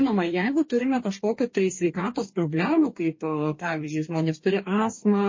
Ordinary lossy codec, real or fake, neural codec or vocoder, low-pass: MP3, 32 kbps; fake; codec, 44.1 kHz, 2.6 kbps, DAC; 7.2 kHz